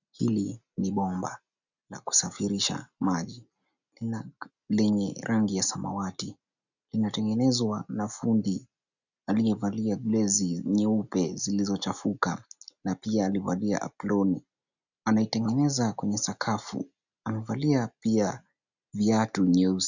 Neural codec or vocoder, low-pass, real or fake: none; 7.2 kHz; real